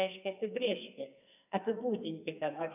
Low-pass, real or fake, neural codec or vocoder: 3.6 kHz; fake; codec, 44.1 kHz, 2.6 kbps, SNAC